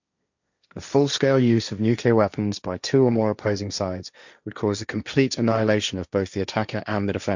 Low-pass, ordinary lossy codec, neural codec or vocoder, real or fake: 7.2 kHz; none; codec, 16 kHz, 1.1 kbps, Voila-Tokenizer; fake